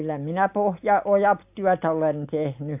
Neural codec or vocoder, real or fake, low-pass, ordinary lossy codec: none; real; 3.6 kHz; AAC, 32 kbps